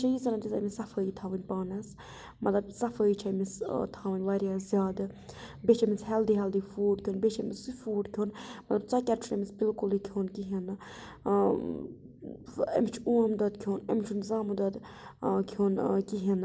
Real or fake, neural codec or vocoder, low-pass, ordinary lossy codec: real; none; none; none